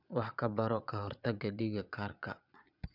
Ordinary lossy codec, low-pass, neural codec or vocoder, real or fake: none; 5.4 kHz; vocoder, 22.05 kHz, 80 mel bands, Vocos; fake